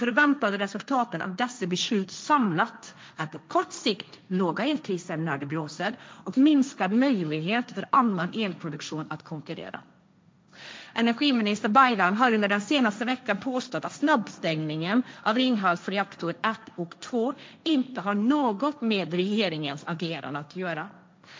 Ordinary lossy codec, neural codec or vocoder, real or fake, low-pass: none; codec, 16 kHz, 1.1 kbps, Voila-Tokenizer; fake; none